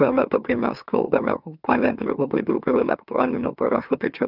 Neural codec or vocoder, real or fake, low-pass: autoencoder, 44.1 kHz, a latent of 192 numbers a frame, MeloTTS; fake; 5.4 kHz